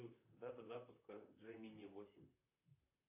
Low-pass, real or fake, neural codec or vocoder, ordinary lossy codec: 3.6 kHz; fake; vocoder, 44.1 kHz, 128 mel bands, Pupu-Vocoder; AAC, 32 kbps